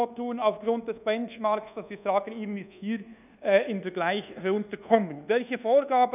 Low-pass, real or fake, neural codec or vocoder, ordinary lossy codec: 3.6 kHz; fake; codec, 24 kHz, 1.2 kbps, DualCodec; none